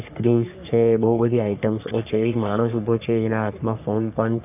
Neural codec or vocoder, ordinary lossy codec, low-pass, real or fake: codec, 44.1 kHz, 3.4 kbps, Pupu-Codec; none; 3.6 kHz; fake